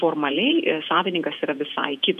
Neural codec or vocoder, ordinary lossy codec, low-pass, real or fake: vocoder, 48 kHz, 128 mel bands, Vocos; AAC, 96 kbps; 14.4 kHz; fake